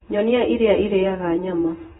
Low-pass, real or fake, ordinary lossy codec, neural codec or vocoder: 19.8 kHz; fake; AAC, 16 kbps; vocoder, 48 kHz, 128 mel bands, Vocos